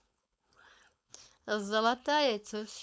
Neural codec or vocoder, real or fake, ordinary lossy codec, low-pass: codec, 16 kHz, 4.8 kbps, FACodec; fake; none; none